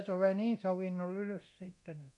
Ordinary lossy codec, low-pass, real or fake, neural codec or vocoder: none; 10.8 kHz; fake; codec, 24 kHz, 0.9 kbps, DualCodec